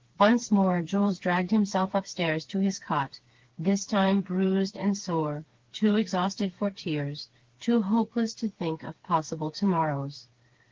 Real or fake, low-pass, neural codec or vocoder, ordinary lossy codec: fake; 7.2 kHz; codec, 16 kHz, 4 kbps, FreqCodec, smaller model; Opus, 16 kbps